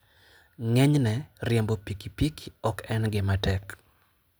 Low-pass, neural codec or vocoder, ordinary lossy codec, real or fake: none; none; none; real